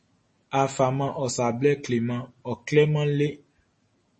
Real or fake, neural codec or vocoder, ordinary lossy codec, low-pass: real; none; MP3, 32 kbps; 10.8 kHz